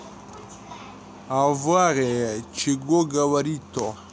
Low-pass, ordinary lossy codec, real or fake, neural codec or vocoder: none; none; real; none